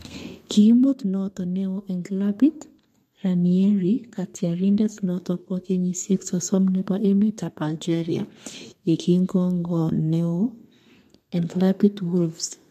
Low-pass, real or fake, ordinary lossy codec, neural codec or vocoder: 14.4 kHz; fake; MP3, 64 kbps; codec, 32 kHz, 1.9 kbps, SNAC